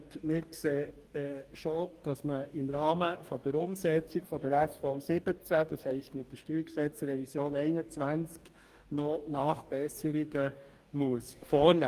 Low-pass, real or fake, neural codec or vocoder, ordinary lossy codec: 14.4 kHz; fake; codec, 44.1 kHz, 2.6 kbps, DAC; Opus, 32 kbps